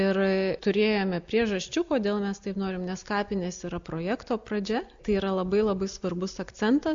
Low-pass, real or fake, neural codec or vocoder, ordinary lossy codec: 7.2 kHz; real; none; AAC, 64 kbps